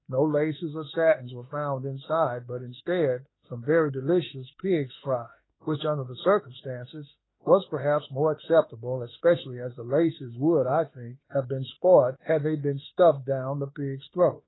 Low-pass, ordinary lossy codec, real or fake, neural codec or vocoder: 7.2 kHz; AAC, 16 kbps; fake; autoencoder, 48 kHz, 32 numbers a frame, DAC-VAE, trained on Japanese speech